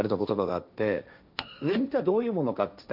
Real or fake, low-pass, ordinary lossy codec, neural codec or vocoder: fake; 5.4 kHz; none; codec, 16 kHz, 1.1 kbps, Voila-Tokenizer